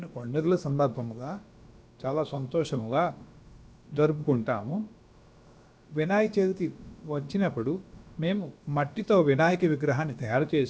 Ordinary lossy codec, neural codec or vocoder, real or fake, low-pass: none; codec, 16 kHz, about 1 kbps, DyCAST, with the encoder's durations; fake; none